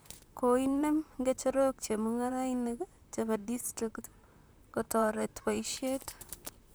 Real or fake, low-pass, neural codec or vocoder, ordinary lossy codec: fake; none; vocoder, 44.1 kHz, 128 mel bands, Pupu-Vocoder; none